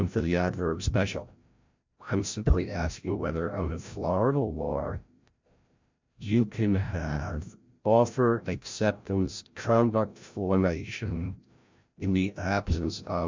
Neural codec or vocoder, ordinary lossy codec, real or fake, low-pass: codec, 16 kHz, 0.5 kbps, FreqCodec, larger model; MP3, 64 kbps; fake; 7.2 kHz